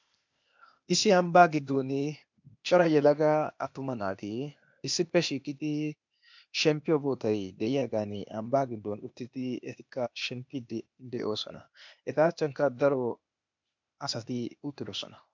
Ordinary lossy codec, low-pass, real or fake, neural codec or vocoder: MP3, 64 kbps; 7.2 kHz; fake; codec, 16 kHz, 0.8 kbps, ZipCodec